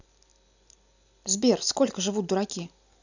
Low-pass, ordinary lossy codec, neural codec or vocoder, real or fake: 7.2 kHz; none; none; real